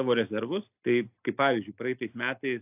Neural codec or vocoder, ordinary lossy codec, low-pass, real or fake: none; AAC, 32 kbps; 3.6 kHz; real